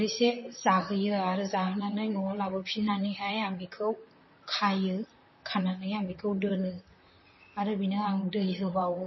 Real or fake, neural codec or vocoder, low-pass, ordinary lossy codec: fake; vocoder, 44.1 kHz, 128 mel bands, Pupu-Vocoder; 7.2 kHz; MP3, 24 kbps